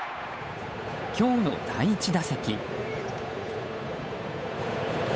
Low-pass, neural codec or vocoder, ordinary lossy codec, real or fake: none; codec, 16 kHz, 8 kbps, FunCodec, trained on Chinese and English, 25 frames a second; none; fake